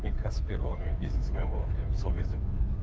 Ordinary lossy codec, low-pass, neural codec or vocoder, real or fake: none; none; codec, 16 kHz, 2 kbps, FunCodec, trained on Chinese and English, 25 frames a second; fake